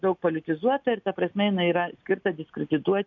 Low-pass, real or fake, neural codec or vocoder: 7.2 kHz; real; none